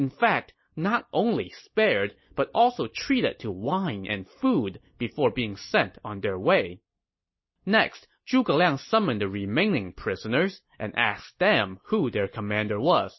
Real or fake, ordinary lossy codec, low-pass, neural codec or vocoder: real; MP3, 24 kbps; 7.2 kHz; none